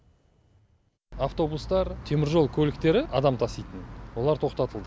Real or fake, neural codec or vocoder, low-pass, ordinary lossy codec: real; none; none; none